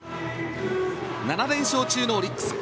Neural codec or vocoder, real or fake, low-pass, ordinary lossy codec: none; real; none; none